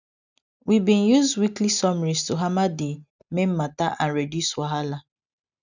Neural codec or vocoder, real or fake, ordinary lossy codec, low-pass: none; real; none; 7.2 kHz